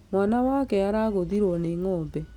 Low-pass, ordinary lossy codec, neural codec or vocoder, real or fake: 19.8 kHz; none; none; real